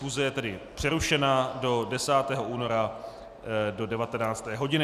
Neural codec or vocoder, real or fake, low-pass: none; real; 14.4 kHz